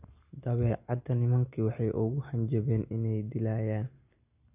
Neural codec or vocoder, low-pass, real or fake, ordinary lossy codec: none; 3.6 kHz; real; none